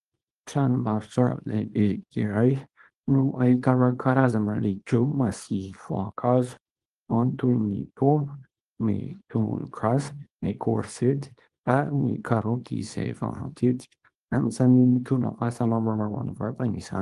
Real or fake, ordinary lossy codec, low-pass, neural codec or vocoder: fake; Opus, 24 kbps; 10.8 kHz; codec, 24 kHz, 0.9 kbps, WavTokenizer, small release